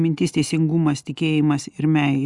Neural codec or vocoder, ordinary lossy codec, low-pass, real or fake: none; Opus, 64 kbps; 10.8 kHz; real